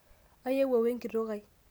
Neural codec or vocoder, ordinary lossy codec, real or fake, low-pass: none; none; real; none